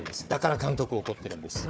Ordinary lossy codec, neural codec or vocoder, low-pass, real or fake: none; codec, 16 kHz, 4 kbps, FunCodec, trained on Chinese and English, 50 frames a second; none; fake